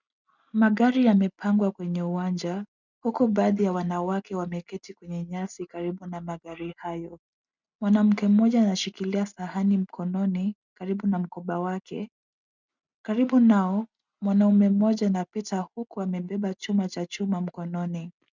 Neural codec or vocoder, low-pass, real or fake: none; 7.2 kHz; real